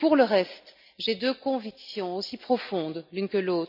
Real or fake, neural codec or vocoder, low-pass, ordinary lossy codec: real; none; 5.4 kHz; none